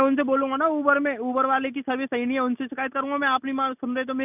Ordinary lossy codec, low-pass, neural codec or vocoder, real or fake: none; 3.6 kHz; none; real